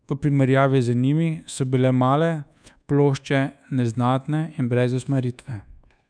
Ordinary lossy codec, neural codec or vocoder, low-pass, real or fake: none; codec, 24 kHz, 1.2 kbps, DualCodec; 9.9 kHz; fake